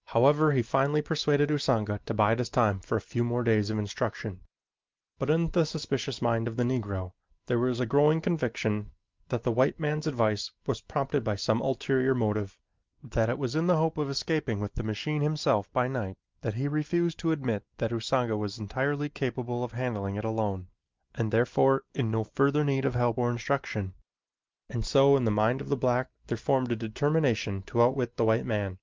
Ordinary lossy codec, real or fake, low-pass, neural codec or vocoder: Opus, 24 kbps; real; 7.2 kHz; none